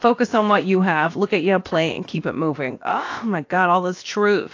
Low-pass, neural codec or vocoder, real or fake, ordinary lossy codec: 7.2 kHz; codec, 16 kHz, about 1 kbps, DyCAST, with the encoder's durations; fake; AAC, 48 kbps